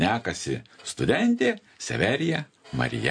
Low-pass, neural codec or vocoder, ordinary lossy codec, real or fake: 9.9 kHz; none; MP3, 48 kbps; real